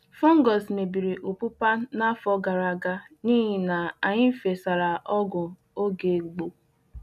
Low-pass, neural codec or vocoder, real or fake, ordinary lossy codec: 14.4 kHz; none; real; none